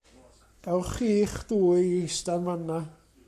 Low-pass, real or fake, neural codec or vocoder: 14.4 kHz; fake; codec, 44.1 kHz, 7.8 kbps, Pupu-Codec